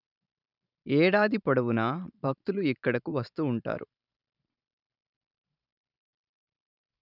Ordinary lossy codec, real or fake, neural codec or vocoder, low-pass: none; fake; vocoder, 44.1 kHz, 128 mel bands every 512 samples, BigVGAN v2; 5.4 kHz